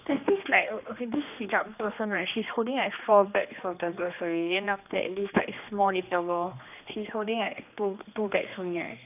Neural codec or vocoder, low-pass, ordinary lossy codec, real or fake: codec, 16 kHz, 2 kbps, X-Codec, HuBERT features, trained on general audio; 3.6 kHz; none; fake